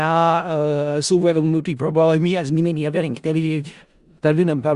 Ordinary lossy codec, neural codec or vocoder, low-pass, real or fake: Opus, 64 kbps; codec, 16 kHz in and 24 kHz out, 0.4 kbps, LongCat-Audio-Codec, four codebook decoder; 10.8 kHz; fake